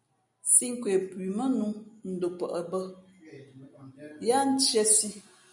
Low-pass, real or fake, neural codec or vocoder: 10.8 kHz; real; none